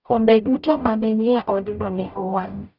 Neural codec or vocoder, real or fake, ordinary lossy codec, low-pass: codec, 44.1 kHz, 0.9 kbps, DAC; fake; none; 5.4 kHz